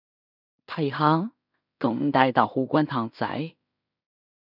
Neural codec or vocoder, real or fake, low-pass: codec, 16 kHz in and 24 kHz out, 0.4 kbps, LongCat-Audio-Codec, two codebook decoder; fake; 5.4 kHz